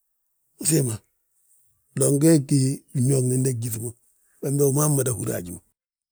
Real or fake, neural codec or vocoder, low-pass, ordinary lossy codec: real; none; none; none